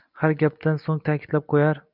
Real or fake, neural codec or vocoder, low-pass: real; none; 5.4 kHz